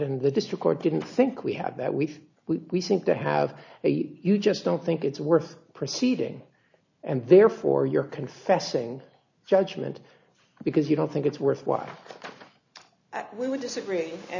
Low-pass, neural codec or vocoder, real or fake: 7.2 kHz; none; real